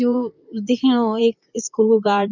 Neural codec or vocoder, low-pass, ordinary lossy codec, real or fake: vocoder, 44.1 kHz, 128 mel bands, Pupu-Vocoder; 7.2 kHz; none; fake